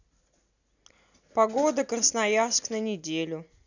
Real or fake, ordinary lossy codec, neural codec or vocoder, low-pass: real; none; none; 7.2 kHz